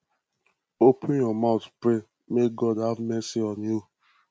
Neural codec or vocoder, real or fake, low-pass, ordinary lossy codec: none; real; none; none